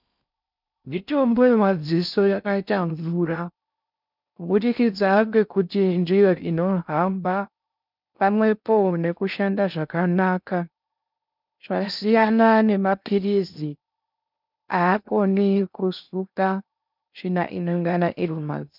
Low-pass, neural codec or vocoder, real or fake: 5.4 kHz; codec, 16 kHz in and 24 kHz out, 0.6 kbps, FocalCodec, streaming, 4096 codes; fake